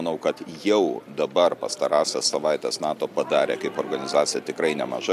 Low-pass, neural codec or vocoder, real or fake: 14.4 kHz; none; real